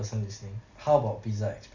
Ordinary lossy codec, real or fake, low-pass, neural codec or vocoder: Opus, 64 kbps; real; 7.2 kHz; none